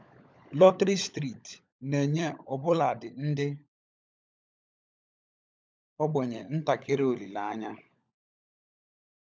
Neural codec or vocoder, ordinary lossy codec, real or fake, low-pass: codec, 16 kHz, 16 kbps, FunCodec, trained on LibriTTS, 50 frames a second; none; fake; none